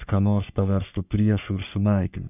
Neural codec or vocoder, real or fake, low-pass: codec, 44.1 kHz, 3.4 kbps, Pupu-Codec; fake; 3.6 kHz